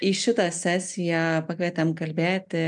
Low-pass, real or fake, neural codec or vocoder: 10.8 kHz; real; none